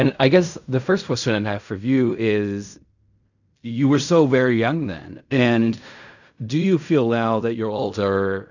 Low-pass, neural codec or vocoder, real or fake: 7.2 kHz; codec, 16 kHz in and 24 kHz out, 0.4 kbps, LongCat-Audio-Codec, fine tuned four codebook decoder; fake